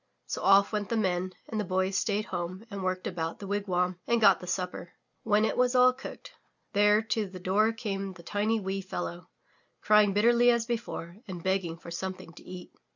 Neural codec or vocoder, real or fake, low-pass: none; real; 7.2 kHz